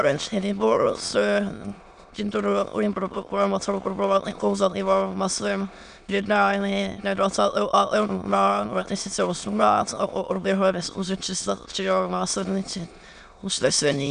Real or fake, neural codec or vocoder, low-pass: fake; autoencoder, 22.05 kHz, a latent of 192 numbers a frame, VITS, trained on many speakers; 9.9 kHz